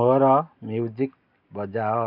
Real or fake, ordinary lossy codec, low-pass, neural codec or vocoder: real; none; 5.4 kHz; none